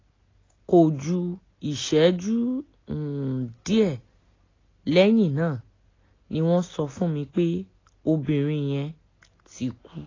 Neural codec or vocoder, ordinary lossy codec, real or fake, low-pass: none; AAC, 32 kbps; real; 7.2 kHz